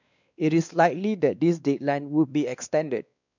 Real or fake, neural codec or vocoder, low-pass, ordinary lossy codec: fake; codec, 16 kHz, 2 kbps, X-Codec, WavLM features, trained on Multilingual LibriSpeech; 7.2 kHz; none